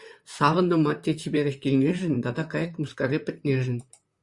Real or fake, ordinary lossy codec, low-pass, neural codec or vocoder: fake; Opus, 64 kbps; 10.8 kHz; vocoder, 44.1 kHz, 128 mel bands, Pupu-Vocoder